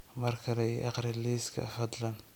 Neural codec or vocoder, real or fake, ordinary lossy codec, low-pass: none; real; none; none